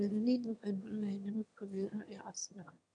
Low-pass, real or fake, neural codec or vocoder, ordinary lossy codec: 9.9 kHz; fake; autoencoder, 22.05 kHz, a latent of 192 numbers a frame, VITS, trained on one speaker; none